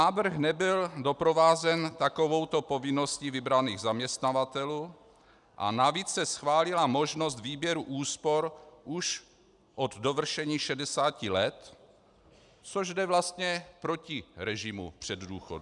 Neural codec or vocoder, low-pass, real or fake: none; 10.8 kHz; real